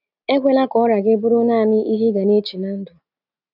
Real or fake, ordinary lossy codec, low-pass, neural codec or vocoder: real; none; 5.4 kHz; none